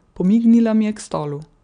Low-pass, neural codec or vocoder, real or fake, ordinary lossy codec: 9.9 kHz; none; real; none